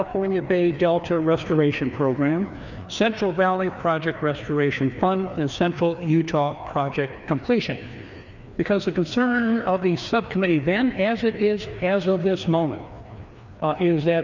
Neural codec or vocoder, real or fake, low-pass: codec, 16 kHz, 2 kbps, FreqCodec, larger model; fake; 7.2 kHz